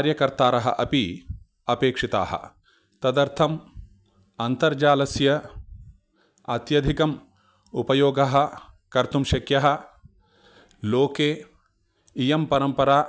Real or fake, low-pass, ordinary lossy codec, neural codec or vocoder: real; none; none; none